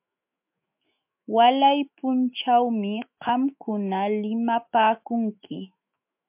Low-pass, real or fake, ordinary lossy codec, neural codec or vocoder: 3.6 kHz; fake; MP3, 32 kbps; autoencoder, 48 kHz, 128 numbers a frame, DAC-VAE, trained on Japanese speech